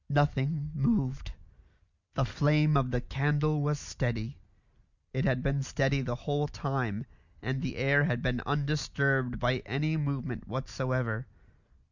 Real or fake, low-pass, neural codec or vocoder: real; 7.2 kHz; none